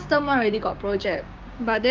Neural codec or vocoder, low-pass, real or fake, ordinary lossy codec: codec, 16 kHz, 6 kbps, DAC; 7.2 kHz; fake; Opus, 24 kbps